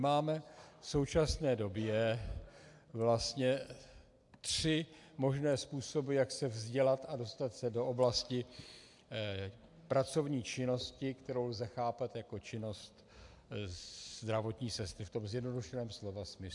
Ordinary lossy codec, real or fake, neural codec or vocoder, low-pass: AAC, 64 kbps; real; none; 10.8 kHz